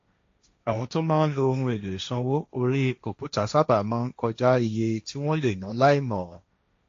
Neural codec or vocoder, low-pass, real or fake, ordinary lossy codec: codec, 16 kHz, 1.1 kbps, Voila-Tokenizer; 7.2 kHz; fake; AAC, 48 kbps